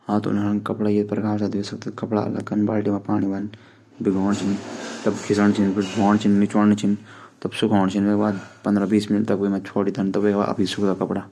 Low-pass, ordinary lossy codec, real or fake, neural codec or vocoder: none; none; real; none